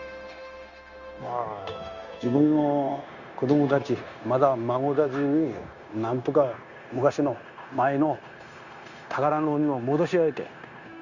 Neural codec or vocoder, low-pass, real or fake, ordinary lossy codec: codec, 16 kHz, 0.9 kbps, LongCat-Audio-Codec; 7.2 kHz; fake; Opus, 64 kbps